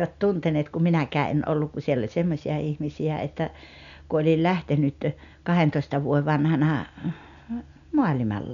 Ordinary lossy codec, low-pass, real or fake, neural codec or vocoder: none; 7.2 kHz; real; none